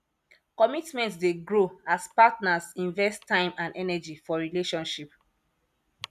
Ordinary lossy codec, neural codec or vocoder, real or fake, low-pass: none; none; real; 14.4 kHz